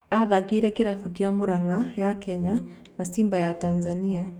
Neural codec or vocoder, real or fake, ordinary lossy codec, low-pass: codec, 44.1 kHz, 2.6 kbps, DAC; fake; none; 19.8 kHz